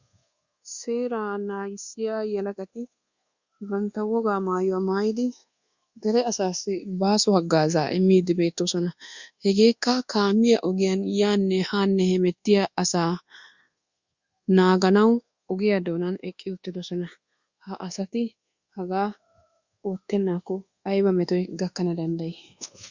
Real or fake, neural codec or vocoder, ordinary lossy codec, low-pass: fake; codec, 24 kHz, 1.2 kbps, DualCodec; Opus, 64 kbps; 7.2 kHz